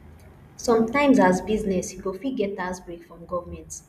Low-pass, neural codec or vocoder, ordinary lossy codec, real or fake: 14.4 kHz; none; none; real